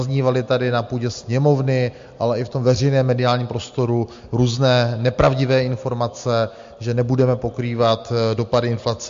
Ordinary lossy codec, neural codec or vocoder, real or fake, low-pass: MP3, 48 kbps; none; real; 7.2 kHz